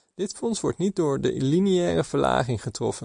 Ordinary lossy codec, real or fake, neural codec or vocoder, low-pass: MP3, 96 kbps; real; none; 9.9 kHz